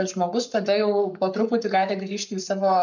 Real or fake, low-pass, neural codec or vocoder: fake; 7.2 kHz; codec, 44.1 kHz, 7.8 kbps, Pupu-Codec